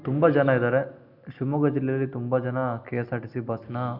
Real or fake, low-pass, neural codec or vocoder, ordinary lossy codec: real; 5.4 kHz; none; none